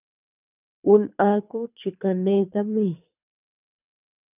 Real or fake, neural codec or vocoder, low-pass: fake; codec, 24 kHz, 3 kbps, HILCodec; 3.6 kHz